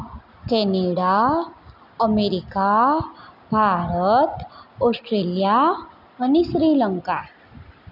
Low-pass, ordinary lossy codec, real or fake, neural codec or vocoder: 5.4 kHz; none; real; none